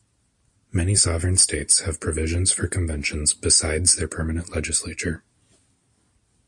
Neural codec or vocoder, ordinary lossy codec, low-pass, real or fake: none; MP3, 48 kbps; 10.8 kHz; real